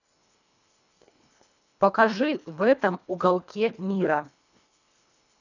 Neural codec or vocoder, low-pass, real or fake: codec, 24 kHz, 1.5 kbps, HILCodec; 7.2 kHz; fake